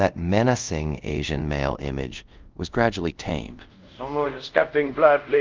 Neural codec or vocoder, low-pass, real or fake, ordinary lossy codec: codec, 24 kHz, 0.5 kbps, DualCodec; 7.2 kHz; fake; Opus, 24 kbps